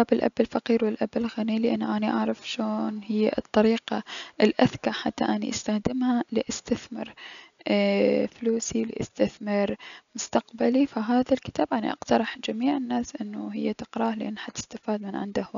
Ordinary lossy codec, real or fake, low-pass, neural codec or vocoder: none; real; 7.2 kHz; none